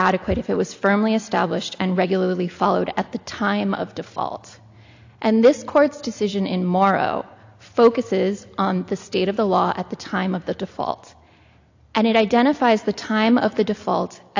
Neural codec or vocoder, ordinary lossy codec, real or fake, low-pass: none; AAC, 48 kbps; real; 7.2 kHz